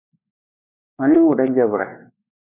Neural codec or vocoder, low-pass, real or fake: codec, 16 kHz, 2 kbps, X-Codec, WavLM features, trained on Multilingual LibriSpeech; 3.6 kHz; fake